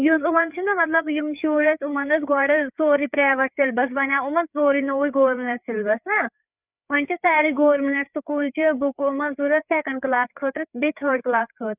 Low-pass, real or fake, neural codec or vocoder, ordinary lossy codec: 3.6 kHz; fake; codec, 16 kHz, 4 kbps, FreqCodec, larger model; none